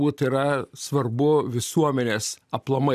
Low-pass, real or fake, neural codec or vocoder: 14.4 kHz; real; none